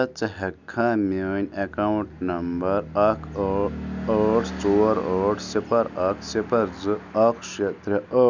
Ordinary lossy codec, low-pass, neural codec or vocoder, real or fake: none; 7.2 kHz; none; real